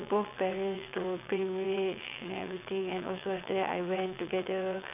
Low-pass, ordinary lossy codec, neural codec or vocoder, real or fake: 3.6 kHz; none; vocoder, 22.05 kHz, 80 mel bands, WaveNeXt; fake